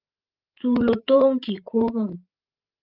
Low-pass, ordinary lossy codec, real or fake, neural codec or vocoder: 5.4 kHz; Opus, 32 kbps; fake; codec, 16 kHz, 16 kbps, FreqCodec, larger model